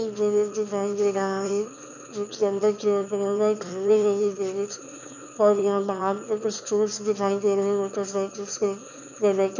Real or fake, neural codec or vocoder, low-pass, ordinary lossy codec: fake; autoencoder, 22.05 kHz, a latent of 192 numbers a frame, VITS, trained on one speaker; 7.2 kHz; none